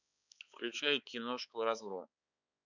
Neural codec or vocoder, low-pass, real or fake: codec, 16 kHz, 4 kbps, X-Codec, HuBERT features, trained on balanced general audio; 7.2 kHz; fake